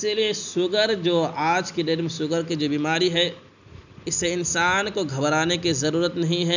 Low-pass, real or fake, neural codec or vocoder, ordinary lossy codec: 7.2 kHz; real; none; none